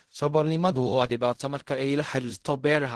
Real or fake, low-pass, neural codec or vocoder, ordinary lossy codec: fake; 10.8 kHz; codec, 16 kHz in and 24 kHz out, 0.4 kbps, LongCat-Audio-Codec, fine tuned four codebook decoder; Opus, 16 kbps